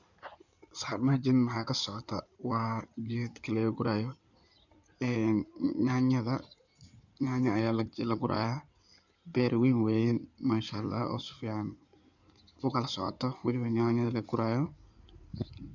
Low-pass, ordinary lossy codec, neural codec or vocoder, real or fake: 7.2 kHz; none; codec, 16 kHz in and 24 kHz out, 2.2 kbps, FireRedTTS-2 codec; fake